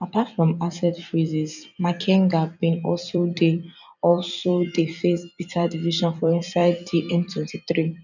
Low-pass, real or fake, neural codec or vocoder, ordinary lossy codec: 7.2 kHz; fake; vocoder, 44.1 kHz, 128 mel bands every 256 samples, BigVGAN v2; none